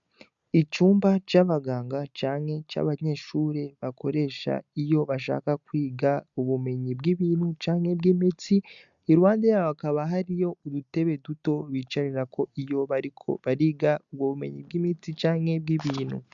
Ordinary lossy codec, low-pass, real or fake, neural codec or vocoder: MP3, 96 kbps; 7.2 kHz; real; none